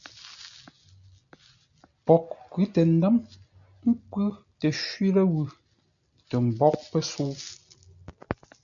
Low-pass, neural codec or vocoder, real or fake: 7.2 kHz; none; real